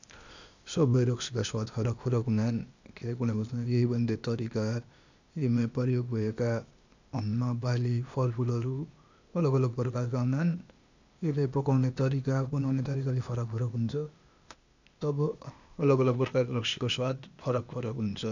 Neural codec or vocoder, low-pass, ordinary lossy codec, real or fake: codec, 16 kHz, 0.8 kbps, ZipCodec; 7.2 kHz; none; fake